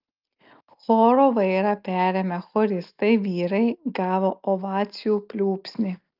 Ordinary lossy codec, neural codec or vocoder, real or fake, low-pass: Opus, 24 kbps; none; real; 5.4 kHz